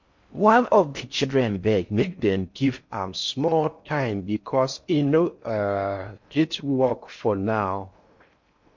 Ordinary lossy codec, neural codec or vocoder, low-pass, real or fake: MP3, 48 kbps; codec, 16 kHz in and 24 kHz out, 0.6 kbps, FocalCodec, streaming, 4096 codes; 7.2 kHz; fake